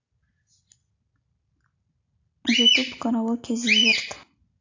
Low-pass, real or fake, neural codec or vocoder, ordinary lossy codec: 7.2 kHz; real; none; AAC, 48 kbps